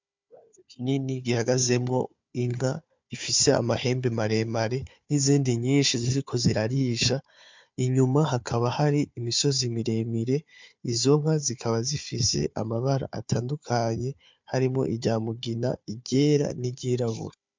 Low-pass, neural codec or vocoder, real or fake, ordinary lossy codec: 7.2 kHz; codec, 16 kHz, 4 kbps, FunCodec, trained on Chinese and English, 50 frames a second; fake; MP3, 64 kbps